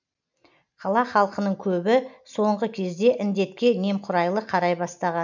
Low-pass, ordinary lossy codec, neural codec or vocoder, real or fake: 7.2 kHz; none; none; real